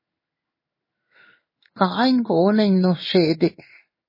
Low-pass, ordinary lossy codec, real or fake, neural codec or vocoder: 5.4 kHz; MP3, 24 kbps; fake; codec, 16 kHz in and 24 kHz out, 1 kbps, XY-Tokenizer